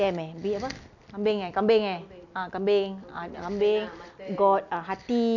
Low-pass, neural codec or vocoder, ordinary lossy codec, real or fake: 7.2 kHz; none; none; real